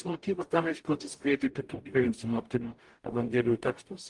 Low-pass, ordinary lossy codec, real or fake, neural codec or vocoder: 10.8 kHz; Opus, 24 kbps; fake; codec, 44.1 kHz, 0.9 kbps, DAC